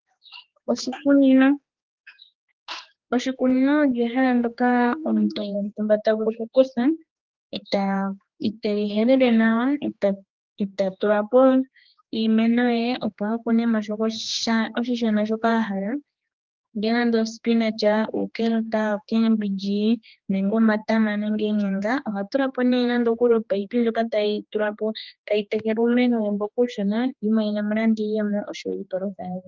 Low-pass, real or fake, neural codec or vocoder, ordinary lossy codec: 7.2 kHz; fake; codec, 16 kHz, 2 kbps, X-Codec, HuBERT features, trained on general audio; Opus, 32 kbps